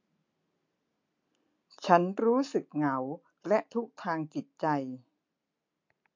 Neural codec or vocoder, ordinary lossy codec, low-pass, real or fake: none; MP3, 48 kbps; 7.2 kHz; real